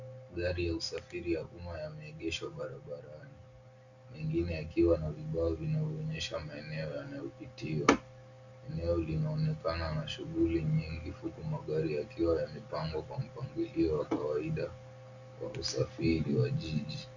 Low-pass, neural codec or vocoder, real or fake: 7.2 kHz; none; real